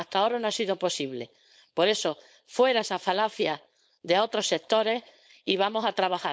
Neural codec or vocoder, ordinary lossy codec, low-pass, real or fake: codec, 16 kHz, 4.8 kbps, FACodec; none; none; fake